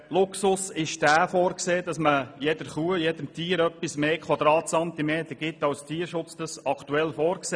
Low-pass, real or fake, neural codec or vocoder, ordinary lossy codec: 9.9 kHz; real; none; none